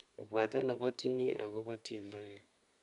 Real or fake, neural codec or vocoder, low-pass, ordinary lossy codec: fake; codec, 24 kHz, 1 kbps, SNAC; 10.8 kHz; none